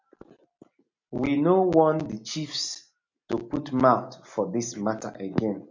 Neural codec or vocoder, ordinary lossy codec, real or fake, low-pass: none; MP3, 48 kbps; real; 7.2 kHz